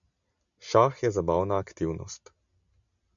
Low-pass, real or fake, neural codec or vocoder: 7.2 kHz; real; none